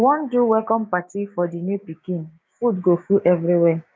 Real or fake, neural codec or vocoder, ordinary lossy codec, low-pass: fake; codec, 16 kHz, 6 kbps, DAC; none; none